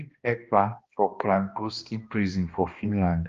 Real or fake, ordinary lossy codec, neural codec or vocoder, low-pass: fake; Opus, 32 kbps; codec, 16 kHz, 1 kbps, X-Codec, HuBERT features, trained on balanced general audio; 7.2 kHz